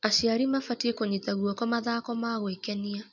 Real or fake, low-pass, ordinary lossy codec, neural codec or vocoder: real; 7.2 kHz; none; none